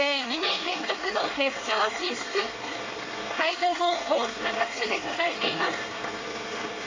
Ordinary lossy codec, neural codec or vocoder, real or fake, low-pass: AAC, 32 kbps; codec, 24 kHz, 1 kbps, SNAC; fake; 7.2 kHz